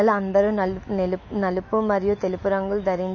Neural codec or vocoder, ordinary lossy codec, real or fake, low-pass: autoencoder, 48 kHz, 128 numbers a frame, DAC-VAE, trained on Japanese speech; MP3, 32 kbps; fake; 7.2 kHz